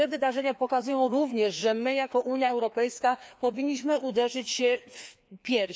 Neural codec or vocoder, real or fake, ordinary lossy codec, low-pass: codec, 16 kHz, 2 kbps, FreqCodec, larger model; fake; none; none